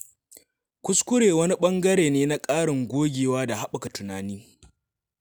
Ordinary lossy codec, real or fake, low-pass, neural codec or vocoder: none; real; none; none